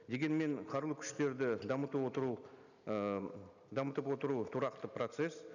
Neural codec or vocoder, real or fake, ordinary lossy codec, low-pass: none; real; none; 7.2 kHz